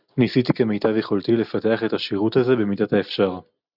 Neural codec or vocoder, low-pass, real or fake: none; 5.4 kHz; real